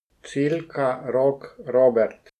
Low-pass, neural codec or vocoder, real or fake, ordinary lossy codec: 14.4 kHz; none; real; none